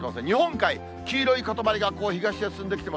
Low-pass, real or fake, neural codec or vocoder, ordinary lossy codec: none; real; none; none